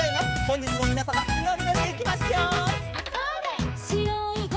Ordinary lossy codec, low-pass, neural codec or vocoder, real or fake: none; none; codec, 16 kHz, 4 kbps, X-Codec, HuBERT features, trained on balanced general audio; fake